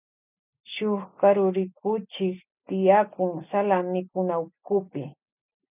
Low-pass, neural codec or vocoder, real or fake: 3.6 kHz; none; real